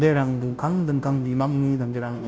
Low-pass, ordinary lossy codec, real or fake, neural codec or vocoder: none; none; fake; codec, 16 kHz, 0.5 kbps, FunCodec, trained on Chinese and English, 25 frames a second